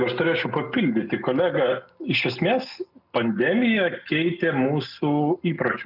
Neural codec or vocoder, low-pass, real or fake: none; 5.4 kHz; real